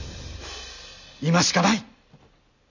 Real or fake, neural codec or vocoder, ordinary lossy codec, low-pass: real; none; none; 7.2 kHz